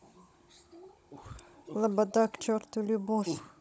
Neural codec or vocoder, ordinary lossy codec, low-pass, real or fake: codec, 16 kHz, 16 kbps, FunCodec, trained on Chinese and English, 50 frames a second; none; none; fake